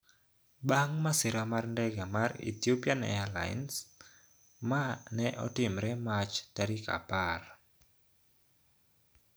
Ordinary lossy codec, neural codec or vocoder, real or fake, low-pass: none; none; real; none